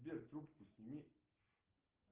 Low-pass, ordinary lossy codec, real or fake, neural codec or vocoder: 3.6 kHz; Opus, 16 kbps; real; none